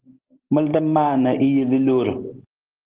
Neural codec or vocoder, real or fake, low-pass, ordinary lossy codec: none; real; 3.6 kHz; Opus, 16 kbps